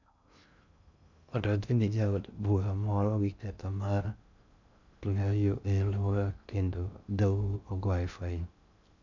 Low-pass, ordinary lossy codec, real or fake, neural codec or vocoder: 7.2 kHz; none; fake; codec, 16 kHz in and 24 kHz out, 0.6 kbps, FocalCodec, streaming, 2048 codes